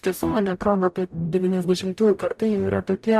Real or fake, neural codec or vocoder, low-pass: fake; codec, 44.1 kHz, 0.9 kbps, DAC; 14.4 kHz